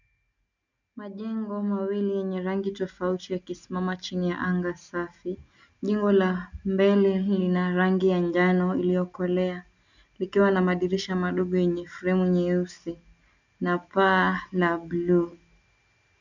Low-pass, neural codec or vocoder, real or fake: 7.2 kHz; none; real